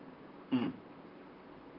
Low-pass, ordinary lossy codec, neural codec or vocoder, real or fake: 5.4 kHz; Opus, 24 kbps; vocoder, 44.1 kHz, 128 mel bands, Pupu-Vocoder; fake